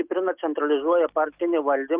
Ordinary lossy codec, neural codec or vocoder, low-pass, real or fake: Opus, 24 kbps; none; 3.6 kHz; real